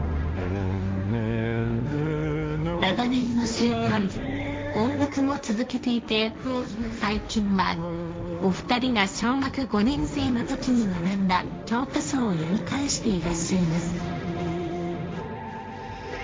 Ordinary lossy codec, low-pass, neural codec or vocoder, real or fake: none; none; codec, 16 kHz, 1.1 kbps, Voila-Tokenizer; fake